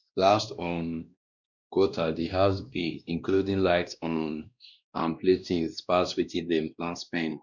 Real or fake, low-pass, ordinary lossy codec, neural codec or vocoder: fake; 7.2 kHz; none; codec, 16 kHz, 1 kbps, X-Codec, WavLM features, trained on Multilingual LibriSpeech